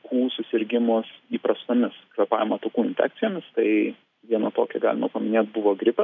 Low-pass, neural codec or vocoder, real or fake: 7.2 kHz; none; real